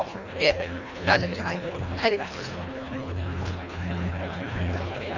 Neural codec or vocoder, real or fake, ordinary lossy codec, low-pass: codec, 24 kHz, 1.5 kbps, HILCodec; fake; none; 7.2 kHz